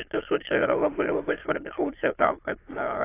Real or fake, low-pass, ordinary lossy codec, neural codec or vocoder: fake; 3.6 kHz; AAC, 24 kbps; autoencoder, 22.05 kHz, a latent of 192 numbers a frame, VITS, trained on many speakers